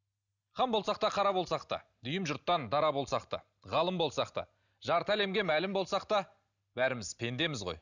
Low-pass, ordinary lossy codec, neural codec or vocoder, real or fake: 7.2 kHz; none; none; real